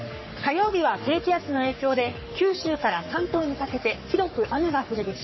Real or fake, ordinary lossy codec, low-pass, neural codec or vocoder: fake; MP3, 24 kbps; 7.2 kHz; codec, 44.1 kHz, 3.4 kbps, Pupu-Codec